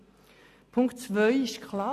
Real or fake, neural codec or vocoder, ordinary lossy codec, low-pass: real; none; none; 14.4 kHz